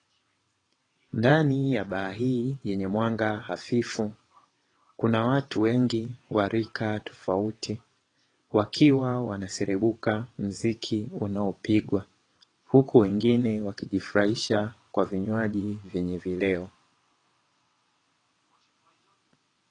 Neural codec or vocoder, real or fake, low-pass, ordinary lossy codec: vocoder, 22.05 kHz, 80 mel bands, WaveNeXt; fake; 9.9 kHz; AAC, 32 kbps